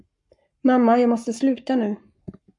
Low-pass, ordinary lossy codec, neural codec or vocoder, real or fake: 10.8 kHz; MP3, 64 kbps; codec, 44.1 kHz, 7.8 kbps, Pupu-Codec; fake